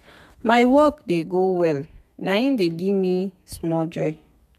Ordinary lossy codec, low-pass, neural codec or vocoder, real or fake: MP3, 96 kbps; 14.4 kHz; codec, 32 kHz, 1.9 kbps, SNAC; fake